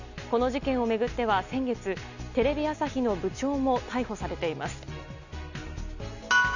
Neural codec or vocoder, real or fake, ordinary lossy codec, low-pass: none; real; none; 7.2 kHz